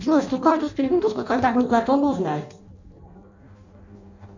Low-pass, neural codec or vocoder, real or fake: 7.2 kHz; codec, 16 kHz in and 24 kHz out, 0.6 kbps, FireRedTTS-2 codec; fake